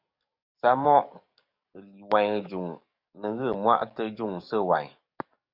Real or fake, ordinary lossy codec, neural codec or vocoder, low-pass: fake; Opus, 64 kbps; codec, 44.1 kHz, 7.8 kbps, DAC; 5.4 kHz